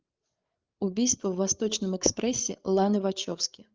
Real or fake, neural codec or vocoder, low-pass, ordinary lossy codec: fake; codec, 16 kHz, 8 kbps, FreqCodec, larger model; 7.2 kHz; Opus, 32 kbps